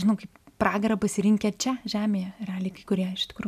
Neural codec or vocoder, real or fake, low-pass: none; real; 14.4 kHz